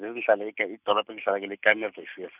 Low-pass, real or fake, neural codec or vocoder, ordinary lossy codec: 3.6 kHz; real; none; none